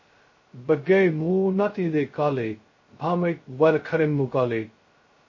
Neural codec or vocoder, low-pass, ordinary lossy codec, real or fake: codec, 16 kHz, 0.2 kbps, FocalCodec; 7.2 kHz; MP3, 32 kbps; fake